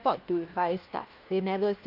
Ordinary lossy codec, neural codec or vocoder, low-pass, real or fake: Opus, 32 kbps; codec, 16 kHz, 1 kbps, FunCodec, trained on LibriTTS, 50 frames a second; 5.4 kHz; fake